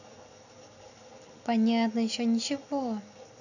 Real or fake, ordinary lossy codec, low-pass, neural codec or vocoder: real; none; 7.2 kHz; none